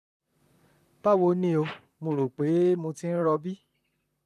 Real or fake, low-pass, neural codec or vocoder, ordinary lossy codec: fake; 14.4 kHz; vocoder, 44.1 kHz, 128 mel bands, Pupu-Vocoder; none